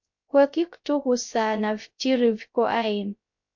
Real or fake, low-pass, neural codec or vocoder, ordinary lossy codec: fake; 7.2 kHz; codec, 16 kHz, 0.3 kbps, FocalCodec; MP3, 48 kbps